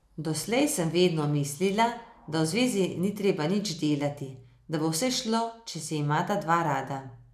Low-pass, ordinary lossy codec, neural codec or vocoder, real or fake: 14.4 kHz; none; none; real